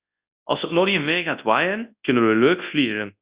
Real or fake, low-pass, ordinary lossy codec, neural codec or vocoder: fake; 3.6 kHz; Opus, 64 kbps; codec, 24 kHz, 0.9 kbps, WavTokenizer, large speech release